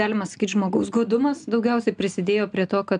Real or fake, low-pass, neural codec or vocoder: real; 9.9 kHz; none